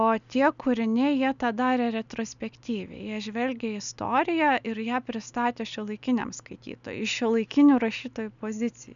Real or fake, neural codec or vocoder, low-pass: real; none; 7.2 kHz